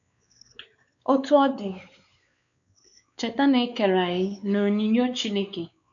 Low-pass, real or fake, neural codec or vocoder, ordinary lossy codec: 7.2 kHz; fake; codec, 16 kHz, 4 kbps, X-Codec, WavLM features, trained on Multilingual LibriSpeech; none